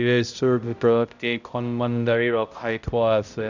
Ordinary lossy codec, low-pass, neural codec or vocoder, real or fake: none; 7.2 kHz; codec, 16 kHz, 0.5 kbps, X-Codec, HuBERT features, trained on balanced general audio; fake